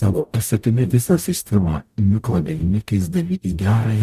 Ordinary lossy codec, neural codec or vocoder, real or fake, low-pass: Opus, 64 kbps; codec, 44.1 kHz, 0.9 kbps, DAC; fake; 14.4 kHz